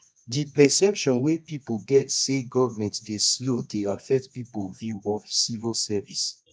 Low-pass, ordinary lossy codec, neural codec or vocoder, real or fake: 9.9 kHz; none; codec, 24 kHz, 0.9 kbps, WavTokenizer, medium music audio release; fake